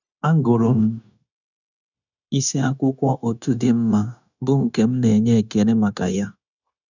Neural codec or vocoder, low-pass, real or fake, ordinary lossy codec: codec, 16 kHz, 0.9 kbps, LongCat-Audio-Codec; 7.2 kHz; fake; none